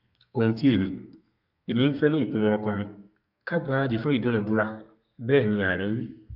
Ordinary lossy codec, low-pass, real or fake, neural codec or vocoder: MP3, 48 kbps; 5.4 kHz; fake; codec, 32 kHz, 1.9 kbps, SNAC